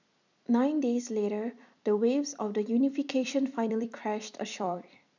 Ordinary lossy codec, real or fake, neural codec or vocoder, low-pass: none; fake; vocoder, 44.1 kHz, 128 mel bands every 256 samples, BigVGAN v2; 7.2 kHz